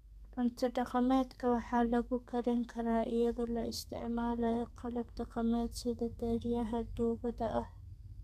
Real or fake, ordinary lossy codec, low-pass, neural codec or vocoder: fake; none; 14.4 kHz; codec, 32 kHz, 1.9 kbps, SNAC